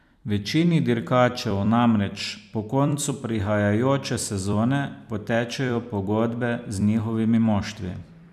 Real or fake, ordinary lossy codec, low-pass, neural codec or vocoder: fake; none; 14.4 kHz; vocoder, 44.1 kHz, 128 mel bands every 256 samples, BigVGAN v2